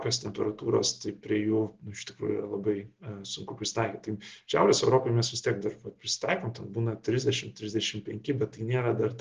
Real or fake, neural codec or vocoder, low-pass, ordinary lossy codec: real; none; 7.2 kHz; Opus, 16 kbps